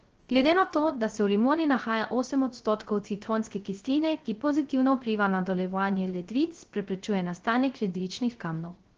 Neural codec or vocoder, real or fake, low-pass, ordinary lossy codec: codec, 16 kHz, 0.3 kbps, FocalCodec; fake; 7.2 kHz; Opus, 16 kbps